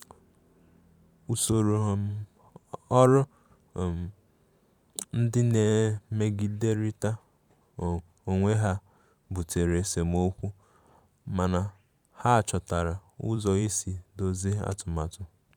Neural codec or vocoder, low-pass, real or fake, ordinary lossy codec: vocoder, 44.1 kHz, 128 mel bands every 512 samples, BigVGAN v2; 19.8 kHz; fake; none